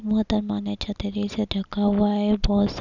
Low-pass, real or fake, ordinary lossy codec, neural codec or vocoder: 7.2 kHz; real; none; none